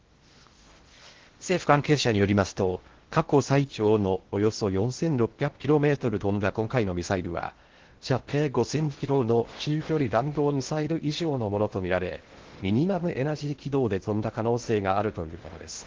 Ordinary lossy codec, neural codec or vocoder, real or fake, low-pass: Opus, 16 kbps; codec, 16 kHz in and 24 kHz out, 0.6 kbps, FocalCodec, streaming, 4096 codes; fake; 7.2 kHz